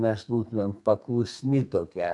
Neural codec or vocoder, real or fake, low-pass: codec, 32 kHz, 1.9 kbps, SNAC; fake; 10.8 kHz